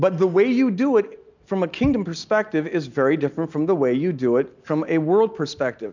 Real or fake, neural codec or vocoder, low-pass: real; none; 7.2 kHz